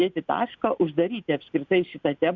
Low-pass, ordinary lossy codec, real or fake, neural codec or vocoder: 7.2 kHz; Opus, 64 kbps; real; none